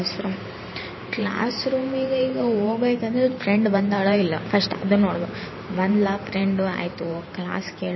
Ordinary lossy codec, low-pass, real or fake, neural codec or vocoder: MP3, 24 kbps; 7.2 kHz; fake; vocoder, 44.1 kHz, 128 mel bands every 512 samples, BigVGAN v2